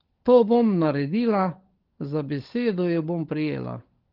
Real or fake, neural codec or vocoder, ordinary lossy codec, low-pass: fake; codec, 16 kHz, 16 kbps, FunCodec, trained on LibriTTS, 50 frames a second; Opus, 16 kbps; 5.4 kHz